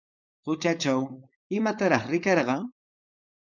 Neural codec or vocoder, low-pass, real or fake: codec, 16 kHz, 4.8 kbps, FACodec; 7.2 kHz; fake